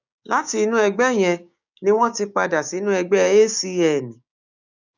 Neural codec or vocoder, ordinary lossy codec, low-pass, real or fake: codec, 16 kHz, 6 kbps, DAC; none; 7.2 kHz; fake